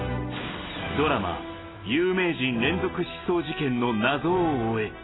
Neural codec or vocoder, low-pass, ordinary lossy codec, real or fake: none; 7.2 kHz; AAC, 16 kbps; real